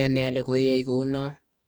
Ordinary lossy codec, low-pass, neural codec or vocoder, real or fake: none; none; codec, 44.1 kHz, 2.6 kbps, DAC; fake